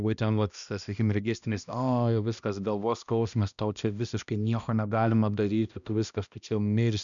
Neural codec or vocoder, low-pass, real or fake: codec, 16 kHz, 1 kbps, X-Codec, HuBERT features, trained on balanced general audio; 7.2 kHz; fake